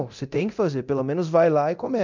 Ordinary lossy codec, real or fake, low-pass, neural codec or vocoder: MP3, 64 kbps; fake; 7.2 kHz; codec, 24 kHz, 0.9 kbps, DualCodec